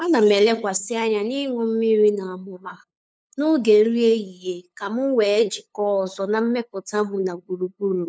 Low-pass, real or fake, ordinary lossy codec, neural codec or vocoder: none; fake; none; codec, 16 kHz, 8 kbps, FunCodec, trained on LibriTTS, 25 frames a second